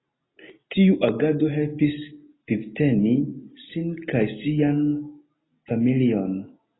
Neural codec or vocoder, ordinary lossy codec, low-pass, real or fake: none; AAC, 16 kbps; 7.2 kHz; real